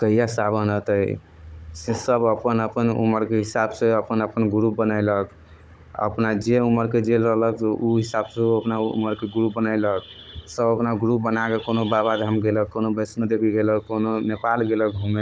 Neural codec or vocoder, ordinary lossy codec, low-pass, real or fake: codec, 16 kHz, 16 kbps, FunCodec, trained on Chinese and English, 50 frames a second; none; none; fake